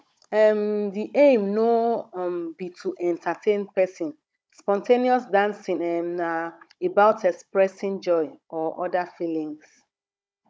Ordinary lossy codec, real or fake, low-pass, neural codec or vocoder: none; fake; none; codec, 16 kHz, 16 kbps, FunCodec, trained on Chinese and English, 50 frames a second